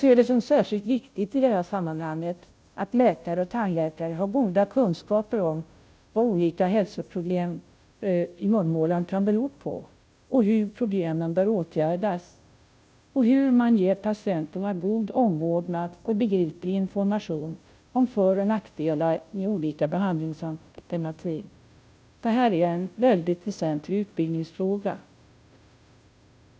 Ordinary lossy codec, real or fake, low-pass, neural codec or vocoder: none; fake; none; codec, 16 kHz, 0.5 kbps, FunCodec, trained on Chinese and English, 25 frames a second